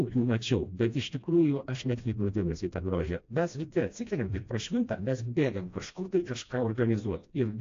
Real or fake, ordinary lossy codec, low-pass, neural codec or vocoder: fake; AAC, 48 kbps; 7.2 kHz; codec, 16 kHz, 1 kbps, FreqCodec, smaller model